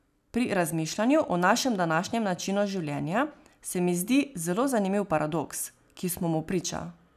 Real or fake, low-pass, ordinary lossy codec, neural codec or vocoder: real; 14.4 kHz; none; none